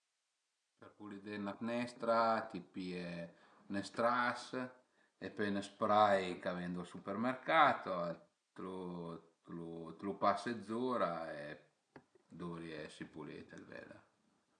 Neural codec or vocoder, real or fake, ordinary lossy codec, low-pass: none; real; none; 9.9 kHz